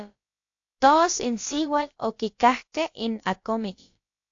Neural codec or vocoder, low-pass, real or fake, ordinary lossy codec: codec, 16 kHz, about 1 kbps, DyCAST, with the encoder's durations; 7.2 kHz; fake; AAC, 48 kbps